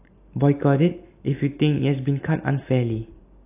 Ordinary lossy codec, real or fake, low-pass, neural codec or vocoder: AAC, 24 kbps; real; 3.6 kHz; none